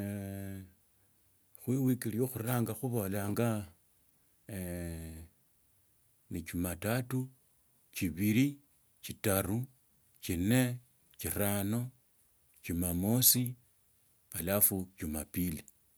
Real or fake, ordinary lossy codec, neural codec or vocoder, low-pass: real; none; none; none